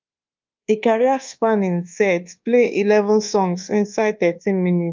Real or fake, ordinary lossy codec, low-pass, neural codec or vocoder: fake; Opus, 32 kbps; 7.2 kHz; codec, 24 kHz, 1.2 kbps, DualCodec